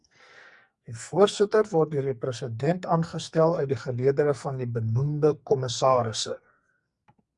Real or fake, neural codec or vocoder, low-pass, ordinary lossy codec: fake; codec, 44.1 kHz, 2.6 kbps, SNAC; 10.8 kHz; Opus, 64 kbps